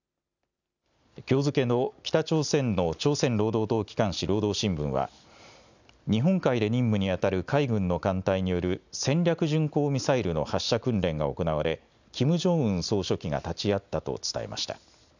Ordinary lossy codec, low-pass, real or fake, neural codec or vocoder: none; 7.2 kHz; real; none